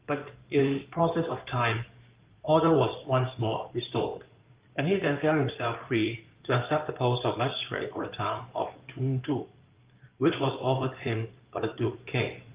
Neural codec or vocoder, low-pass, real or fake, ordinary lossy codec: codec, 16 kHz in and 24 kHz out, 2.2 kbps, FireRedTTS-2 codec; 3.6 kHz; fake; Opus, 32 kbps